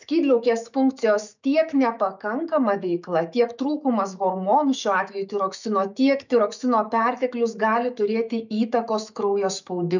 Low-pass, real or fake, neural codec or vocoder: 7.2 kHz; fake; autoencoder, 48 kHz, 128 numbers a frame, DAC-VAE, trained on Japanese speech